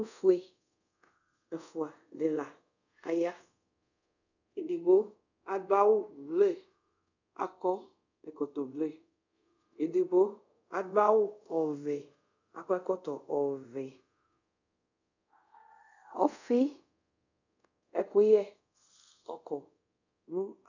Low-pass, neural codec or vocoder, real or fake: 7.2 kHz; codec, 24 kHz, 0.5 kbps, DualCodec; fake